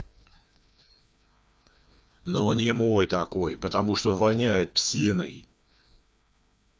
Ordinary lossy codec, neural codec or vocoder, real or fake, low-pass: none; codec, 16 kHz, 2 kbps, FreqCodec, larger model; fake; none